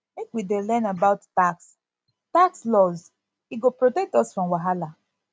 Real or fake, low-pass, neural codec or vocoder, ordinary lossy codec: real; none; none; none